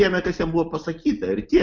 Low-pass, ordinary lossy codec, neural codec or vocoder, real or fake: 7.2 kHz; Opus, 64 kbps; none; real